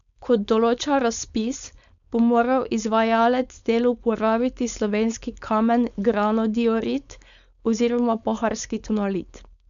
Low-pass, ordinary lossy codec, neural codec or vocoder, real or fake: 7.2 kHz; none; codec, 16 kHz, 4.8 kbps, FACodec; fake